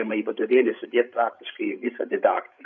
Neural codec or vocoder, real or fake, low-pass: codec, 16 kHz, 16 kbps, FreqCodec, larger model; fake; 7.2 kHz